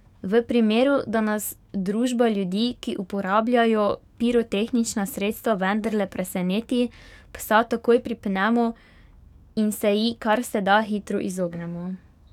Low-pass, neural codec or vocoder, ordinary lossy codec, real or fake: 19.8 kHz; codec, 44.1 kHz, 7.8 kbps, DAC; none; fake